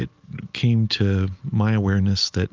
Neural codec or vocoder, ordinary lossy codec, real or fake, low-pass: none; Opus, 24 kbps; real; 7.2 kHz